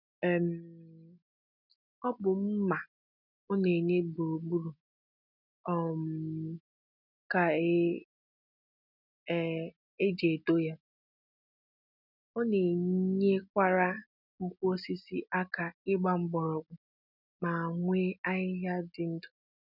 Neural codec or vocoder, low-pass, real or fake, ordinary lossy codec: none; 5.4 kHz; real; none